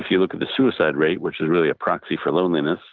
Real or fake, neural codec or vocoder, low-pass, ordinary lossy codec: real; none; 7.2 kHz; Opus, 24 kbps